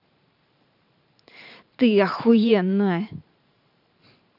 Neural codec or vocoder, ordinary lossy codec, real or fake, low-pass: vocoder, 44.1 kHz, 128 mel bands every 512 samples, BigVGAN v2; none; fake; 5.4 kHz